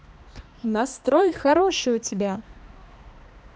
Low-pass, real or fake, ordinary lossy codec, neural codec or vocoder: none; fake; none; codec, 16 kHz, 2 kbps, X-Codec, HuBERT features, trained on balanced general audio